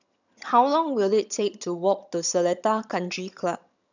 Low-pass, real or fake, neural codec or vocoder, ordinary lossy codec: 7.2 kHz; fake; vocoder, 22.05 kHz, 80 mel bands, HiFi-GAN; none